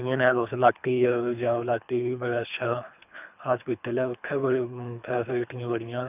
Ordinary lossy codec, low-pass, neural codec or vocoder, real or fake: none; 3.6 kHz; codec, 24 kHz, 3 kbps, HILCodec; fake